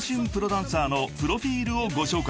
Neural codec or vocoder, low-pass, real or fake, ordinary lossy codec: none; none; real; none